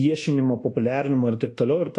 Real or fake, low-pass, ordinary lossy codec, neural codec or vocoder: fake; 10.8 kHz; AAC, 48 kbps; codec, 24 kHz, 1.2 kbps, DualCodec